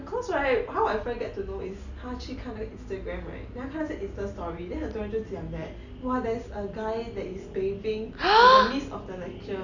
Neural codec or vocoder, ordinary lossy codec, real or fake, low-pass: none; none; real; 7.2 kHz